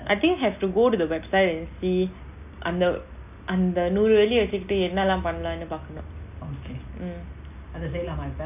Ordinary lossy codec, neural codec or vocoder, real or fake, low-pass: none; none; real; 3.6 kHz